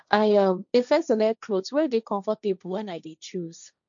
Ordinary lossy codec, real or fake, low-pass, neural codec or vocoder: none; fake; 7.2 kHz; codec, 16 kHz, 1.1 kbps, Voila-Tokenizer